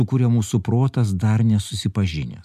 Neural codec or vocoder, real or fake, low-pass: none; real; 14.4 kHz